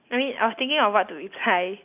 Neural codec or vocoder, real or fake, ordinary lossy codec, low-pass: none; real; none; 3.6 kHz